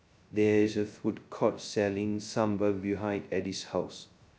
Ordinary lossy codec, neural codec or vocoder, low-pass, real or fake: none; codec, 16 kHz, 0.2 kbps, FocalCodec; none; fake